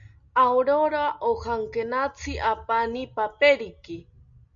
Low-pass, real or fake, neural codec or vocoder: 7.2 kHz; real; none